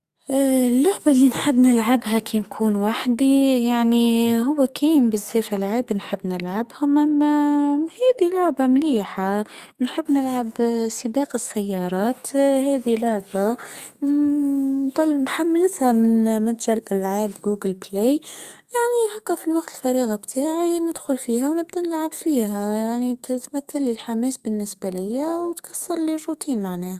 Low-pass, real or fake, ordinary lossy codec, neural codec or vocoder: 14.4 kHz; fake; Opus, 64 kbps; codec, 44.1 kHz, 2.6 kbps, SNAC